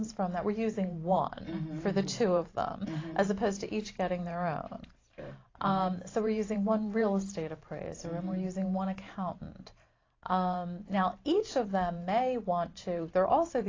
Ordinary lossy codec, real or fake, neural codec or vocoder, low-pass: AAC, 32 kbps; fake; vocoder, 44.1 kHz, 128 mel bands every 512 samples, BigVGAN v2; 7.2 kHz